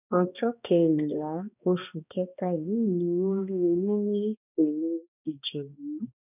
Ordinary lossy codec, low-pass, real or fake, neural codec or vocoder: none; 3.6 kHz; fake; codec, 16 kHz, 1 kbps, X-Codec, HuBERT features, trained on balanced general audio